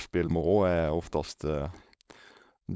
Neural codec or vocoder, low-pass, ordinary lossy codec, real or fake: codec, 16 kHz, 4.8 kbps, FACodec; none; none; fake